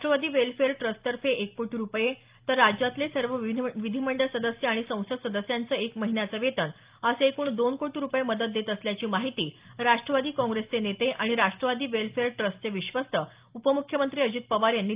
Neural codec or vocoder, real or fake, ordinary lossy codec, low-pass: none; real; Opus, 32 kbps; 3.6 kHz